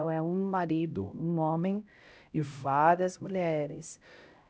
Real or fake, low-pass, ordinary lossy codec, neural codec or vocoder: fake; none; none; codec, 16 kHz, 0.5 kbps, X-Codec, HuBERT features, trained on LibriSpeech